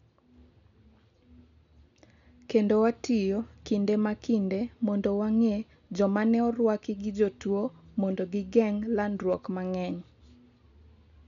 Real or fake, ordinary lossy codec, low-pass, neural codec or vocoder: real; none; 7.2 kHz; none